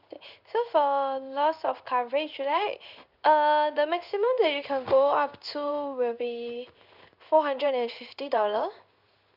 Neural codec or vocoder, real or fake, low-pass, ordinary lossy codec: codec, 16 kHz in and 24 kHz out, 1 kbps, XY-Tokenizer; fake; 5.4 kHz; none